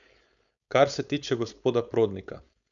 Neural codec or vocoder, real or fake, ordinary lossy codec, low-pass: codec, 16 kHz, 4.8 kbps, FACodec; fake; none; 7.2 kHz